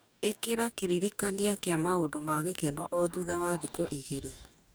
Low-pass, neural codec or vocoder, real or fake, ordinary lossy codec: none; codec, 44.1 kHz, 2.6 kbps, DAC; fake; none